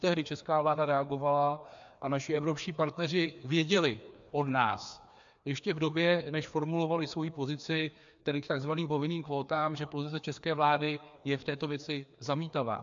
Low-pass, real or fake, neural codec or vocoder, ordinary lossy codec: 7.2 kHz; fake; codec, 16 kHz, 2 kbps, FreqCodec, larger model; AAC, 64 kbps